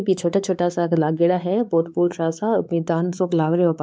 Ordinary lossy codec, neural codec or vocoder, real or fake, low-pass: none; codec, 16 kHz, 4 kbps, X-Codec, WavLM features, trained on Multilingual LibriSpeech; fake; none